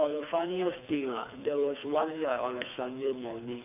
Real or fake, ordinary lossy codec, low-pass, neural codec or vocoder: fake; none; 3.6 kHz; codec, 24 kHz, 3 kbps, HILCodec